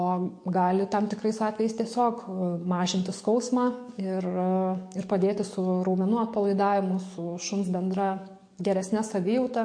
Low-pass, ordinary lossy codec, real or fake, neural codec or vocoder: 9.9 kHz; MP3, 48 kbps; fake; codec, 44.1 kHz, 7.8 kbps, DAC